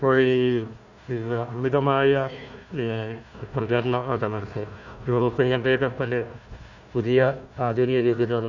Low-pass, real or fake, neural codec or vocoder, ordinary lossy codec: 7.2 kHz; fake; codec, 16 kHz, 1 kbps, FunCodec, trained on Chinese and English, 50 frames a second; none